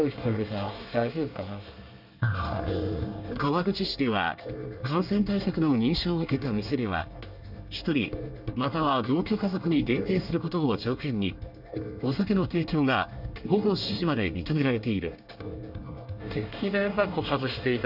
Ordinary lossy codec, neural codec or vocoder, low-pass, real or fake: none; codec, 24 kHz, 1 kbps, SNAC; 5.4 kHz; fake